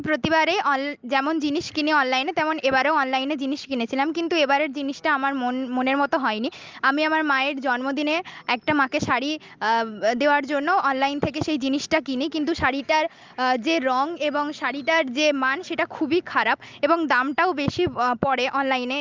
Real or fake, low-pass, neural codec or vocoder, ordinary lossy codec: real; 7.2 kHz; none; Opus, 32 kbps